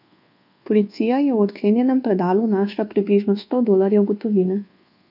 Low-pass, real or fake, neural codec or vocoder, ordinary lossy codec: 5.4 kHz; fake; codec, 24 kHz, 1.2 kbps, DualCodec; none